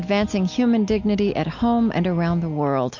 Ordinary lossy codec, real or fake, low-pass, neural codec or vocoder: MP3, 48 kbps; real; 7.2 kHz; none